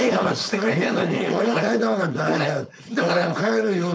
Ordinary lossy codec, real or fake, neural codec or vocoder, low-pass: none; fake; codec, 16 kHz, 4.8 kbps, FACodec; none